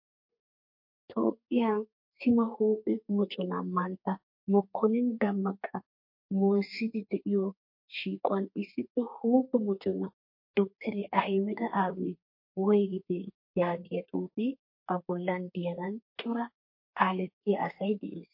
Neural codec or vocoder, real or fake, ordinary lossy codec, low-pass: codec, 32 kHz, 1.9 kbps, SNAC; fake; MP3, 32 kbps; 5.4 kHz